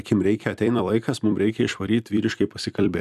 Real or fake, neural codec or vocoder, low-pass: fake; vocoder, 44.1 kHz, 128 mel bands every 256 samples, BigVGAN v2; 14.4 kHz